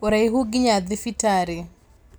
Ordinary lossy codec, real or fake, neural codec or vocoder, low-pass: none; real; none; none